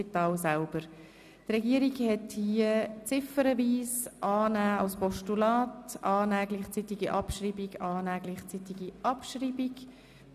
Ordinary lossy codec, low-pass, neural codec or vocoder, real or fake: none; 14.4 kHz; none; real